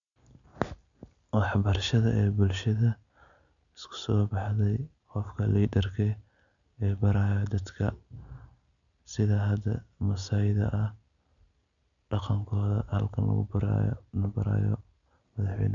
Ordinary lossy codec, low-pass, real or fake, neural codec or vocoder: none; 7.2 kHz; real; none